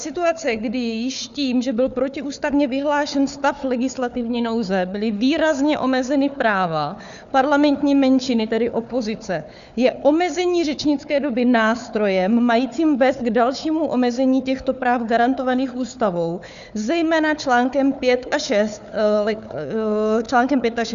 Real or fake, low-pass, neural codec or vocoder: fake; 7.2 kHz; codec, 16 kHz, 4 kbps, FunCodec, trained on Chinese and English, 50 frames a second